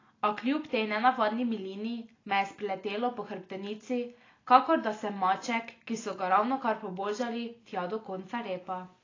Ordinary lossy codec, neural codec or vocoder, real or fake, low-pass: AAC, 32 kbps; none; real; 7.2 kHz